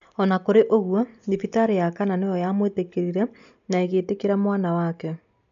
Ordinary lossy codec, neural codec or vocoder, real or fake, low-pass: none; none; real; 7.2 kHz